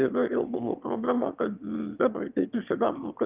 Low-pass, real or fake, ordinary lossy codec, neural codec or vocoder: 3.6 kHz; fake; Opus, 24 kbps; autoencoder, 22.05 kHz, a latent of 192 numbers a frame, VITS, trained on one speaker